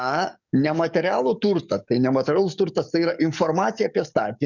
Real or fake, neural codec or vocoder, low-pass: fake; codec, 44.1 kHz, 7.8 kbps, DAC; 7.2 kHz